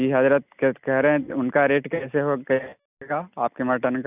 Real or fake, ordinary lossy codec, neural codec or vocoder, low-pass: real; none; none; 3.6 kHz